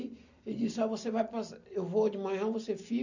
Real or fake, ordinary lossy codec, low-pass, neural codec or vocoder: real; none; 7.2 kHz; none